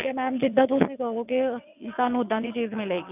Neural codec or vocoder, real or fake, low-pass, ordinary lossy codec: vocoder, 22.05 kHz, 80 mel bands, WaveNeXt; fake; 3.6 kHz; none